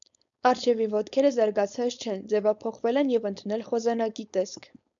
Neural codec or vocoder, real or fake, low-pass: codec, 16 kHz, 4.8 kbps, FACodec; fake; 7.2 kHz